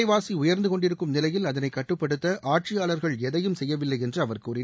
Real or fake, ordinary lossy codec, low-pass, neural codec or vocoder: real; none; none; none